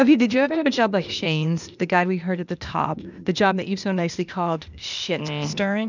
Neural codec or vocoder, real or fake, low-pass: codec, 16 kHz, 0.8 kbps, ZipCodec; fake; 7.2 kHz